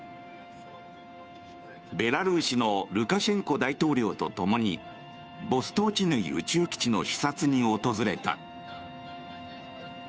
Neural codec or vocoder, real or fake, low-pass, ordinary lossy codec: codec, 16 kHz, 2 kbps, FunCodec, trained on Chinese and English, 25 frames a second; fake; none; none